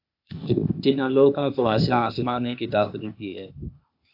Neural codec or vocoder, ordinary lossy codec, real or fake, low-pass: codec, 16 kHz, 0.8 kbps, ZipCodec; AAC, 48 kbps; fake; 5.4 kHz